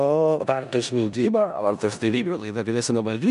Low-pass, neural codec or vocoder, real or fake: 10.8 kHz; codec, 16 kHz in and 24 kHz out, 0.4 kbps, LongCat-Audio-Codec, four codebook decoder; fake